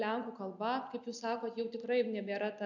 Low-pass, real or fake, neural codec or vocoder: 7.2 kHz; real; none